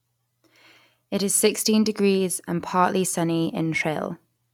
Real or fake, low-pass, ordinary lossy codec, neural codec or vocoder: real; 19.8 kHz; none; none